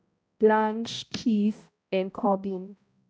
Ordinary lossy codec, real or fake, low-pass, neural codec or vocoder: none; fake; none; codec, 16 kHz, 0.5 kbps, X-Codec, HuBERT features, trained on balanced general audio